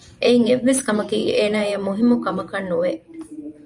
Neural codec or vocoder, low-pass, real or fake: vocoder, 44.1 kHz, 128 mel bands every 512 samples, BigVGAN v2; 10.8 kHz; fake